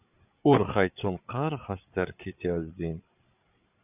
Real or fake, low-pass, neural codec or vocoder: fake; 3.6 kHz; codec, 16 kHz, 8 kbps, FreqCodec, larger model